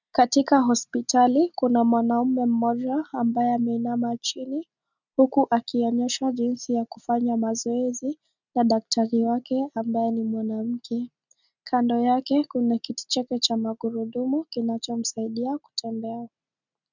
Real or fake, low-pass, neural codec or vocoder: real; 7.2 kHz; none